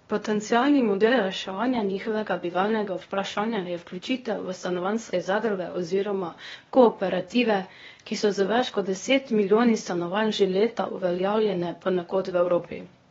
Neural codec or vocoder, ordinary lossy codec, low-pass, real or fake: codec, 16 kHz, 0.8 kbps, ZipCodec; AAC, 24 kbps; 7.2 kHz; fake